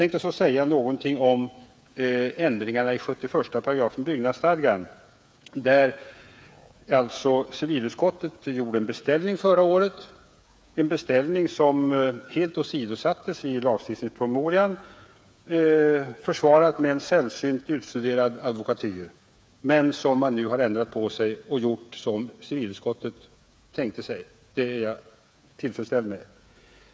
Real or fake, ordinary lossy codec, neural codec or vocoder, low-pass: fake; none; codec, 16 kHz, 8 kbps, FreqCodec, smaller model; none